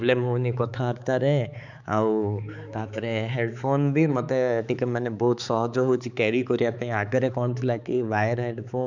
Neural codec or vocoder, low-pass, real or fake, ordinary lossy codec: codec, 16 kHz, 4 kbps, X-Codec, HuBERT features, trained on balanced general audio; 7.2 kHz; fake; none